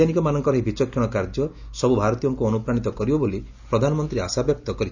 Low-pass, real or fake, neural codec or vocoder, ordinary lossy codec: 7.2 kHz; real; none; none